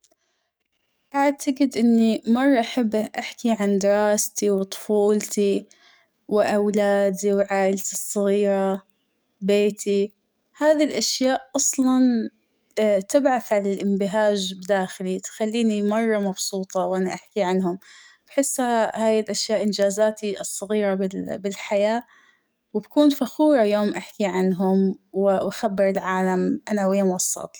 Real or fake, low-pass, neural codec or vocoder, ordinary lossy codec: fake; none; codec, 44.1 kHz, 7.8 kbps, DAC; none